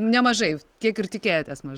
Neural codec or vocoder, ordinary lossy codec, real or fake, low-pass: none; Opus, 24 kbps; real; 19.8 kHz